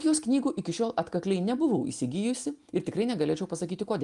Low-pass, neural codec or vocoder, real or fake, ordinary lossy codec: 10.8 kHz; none; real; Opus, 32 kbps